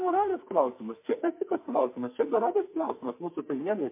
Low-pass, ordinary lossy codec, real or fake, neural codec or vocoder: 3.6 kHz; MP3, 24 kbps; fake; codec, 44.1 kHz, 2.6 kbps, SNAC